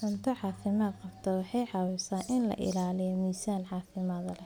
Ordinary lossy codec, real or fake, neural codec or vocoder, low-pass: none; real; none; none